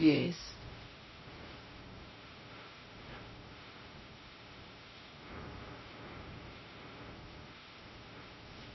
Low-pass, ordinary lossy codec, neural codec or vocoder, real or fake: 7.2 kHz; MP3, 24 kbps; codec, 16 kHz, 0.5 kbps, X-Codec, WavLM features, trained on Multilingual LibriSpeech; fake